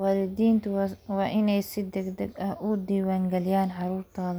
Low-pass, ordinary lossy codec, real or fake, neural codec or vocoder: none; none; real; none